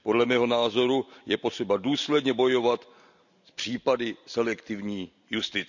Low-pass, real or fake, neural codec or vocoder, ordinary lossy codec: 7.2 kHz; real; none; none